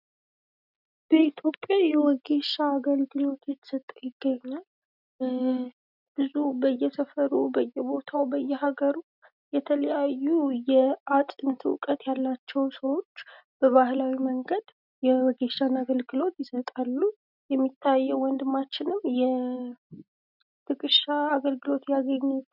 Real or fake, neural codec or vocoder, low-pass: real; none; 5.4 kHz